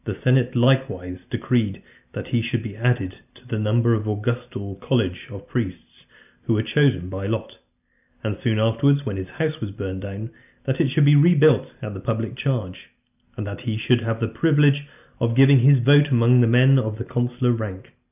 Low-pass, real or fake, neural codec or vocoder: 3.6 kHz; real; none